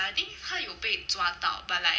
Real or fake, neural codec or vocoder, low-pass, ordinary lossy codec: real; none; none; none